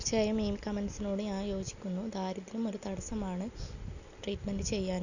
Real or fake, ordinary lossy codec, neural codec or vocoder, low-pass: real; none; none; 7.2 kHz